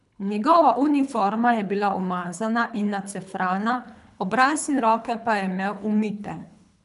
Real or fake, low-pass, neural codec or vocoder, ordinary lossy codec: fake; 10.8 kHz; codec, 24 kHz, 3 kbps, HILCodec; none